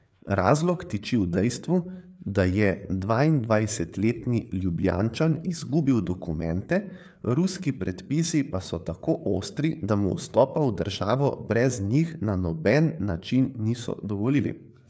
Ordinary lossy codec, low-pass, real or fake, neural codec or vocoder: none; none; fake; codec, 16 kHz, 4 kbps, FreqCodec, larger model